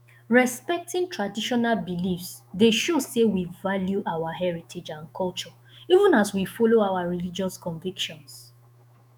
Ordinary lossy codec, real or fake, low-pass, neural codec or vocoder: none; fake; none; autoencoder, 48 kHz, 128 numbers a frame, DAC-VAE, trained on Japanese speech